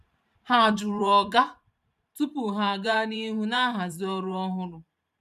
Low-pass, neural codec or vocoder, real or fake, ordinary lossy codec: 14.4 kHz; vocoder, 44.1 kHz, 128 mel bands every 512 samples, BigVGAN v2; fake; none